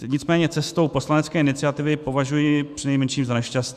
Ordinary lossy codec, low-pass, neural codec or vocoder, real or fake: Opus, 64 kbps; 14.4 kHz; autoencoder, 48 kHz, 128 numbers a frame, DAC-VAE, trained on Japanese speech; fake